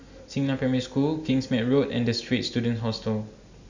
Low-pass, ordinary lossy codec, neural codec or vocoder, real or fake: 7.2 kHz; Opus, 64 kbps; none; real